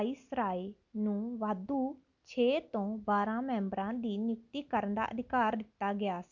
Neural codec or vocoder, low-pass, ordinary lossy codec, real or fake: none; 7.2 kHz; none; real